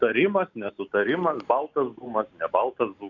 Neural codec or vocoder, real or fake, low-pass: none; real; 7.2 kHz